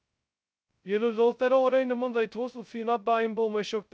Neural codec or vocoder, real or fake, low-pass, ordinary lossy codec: codec, 16 kHz, 0.2 kbps, FocalCodec; fake; none; none